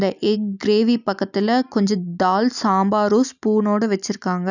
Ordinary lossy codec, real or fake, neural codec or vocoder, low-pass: none; real; none; 7.2 kHz